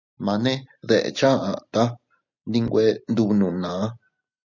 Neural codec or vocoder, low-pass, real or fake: none; 7.2 kHz; real